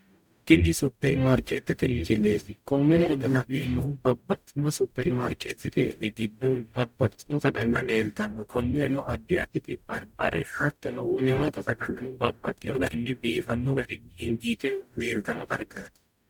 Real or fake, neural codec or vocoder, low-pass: fake; codec, 44.1 kHz, 0.9 kbps, DAC; 19.8 kHz